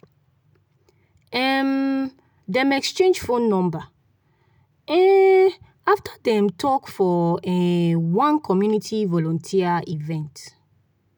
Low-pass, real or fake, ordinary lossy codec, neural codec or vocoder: none; real; none; none